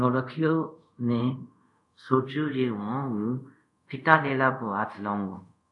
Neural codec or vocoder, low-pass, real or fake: codec, 24 kHz, 0.5 kbps, DualCodec; 10.8 kHz; fake